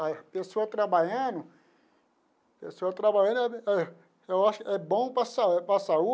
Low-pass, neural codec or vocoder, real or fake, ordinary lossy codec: none; none; real; none